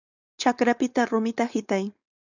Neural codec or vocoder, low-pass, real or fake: codec, 16 kHz, 4.8 kbps, FACodec; 7.2 kHz; fake